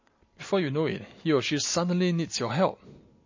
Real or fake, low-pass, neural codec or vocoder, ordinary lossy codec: real; 7.2 kHz; none; MP3, 32 kbps